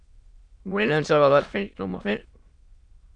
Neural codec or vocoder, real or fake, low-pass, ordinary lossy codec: autoencoder, 22.05 kHz, a latent of 192 numbers a frame, VITS, trained on many speakers; fake; 9.9 kHz; Opus, 64 kbps